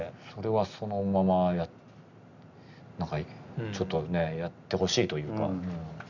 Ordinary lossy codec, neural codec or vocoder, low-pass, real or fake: none; none; 7.2 kHz; real